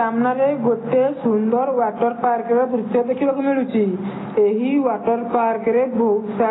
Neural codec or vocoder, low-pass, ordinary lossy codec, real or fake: none; 7.2 kHz; AAC, 16 kbps; real